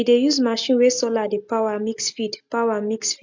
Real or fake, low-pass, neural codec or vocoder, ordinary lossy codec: real; 7.2 kHz; none; MP3, 64 kbps